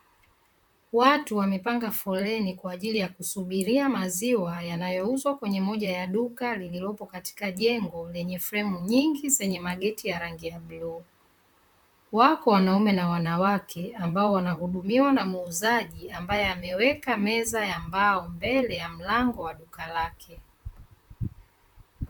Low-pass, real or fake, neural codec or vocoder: 19.8 kHz; fake; vocoder, 44.1 kHz, 128 mel bands, Pupu-Vocoder